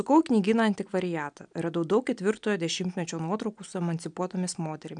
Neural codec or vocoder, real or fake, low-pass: none; real; 9.9 kHz